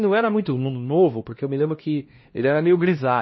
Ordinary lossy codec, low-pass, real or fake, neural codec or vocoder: MP3, 24 kbps; 7.2 kHz; fake; codec, 16 kHz, 1 kbps, X-Codec, HuBERT features, trained on LibriSpeech